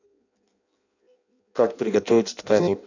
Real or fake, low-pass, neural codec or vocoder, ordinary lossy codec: fake; 7.2 kHz; codec, 16 kHz in and 24 kHz out, 0.6 kbps, FireRedTTS-2 codec; none